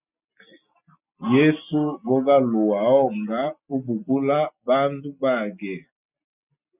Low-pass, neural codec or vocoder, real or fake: 3.6 kHz; none; real